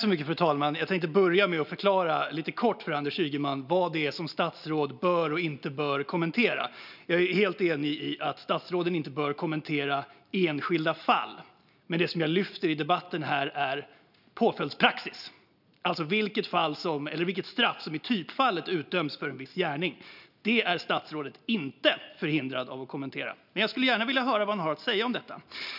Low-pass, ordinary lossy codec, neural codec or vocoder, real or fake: 5.4 kHz; none; none; real